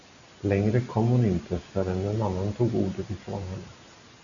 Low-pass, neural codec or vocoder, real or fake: 7.2 kHz; none; real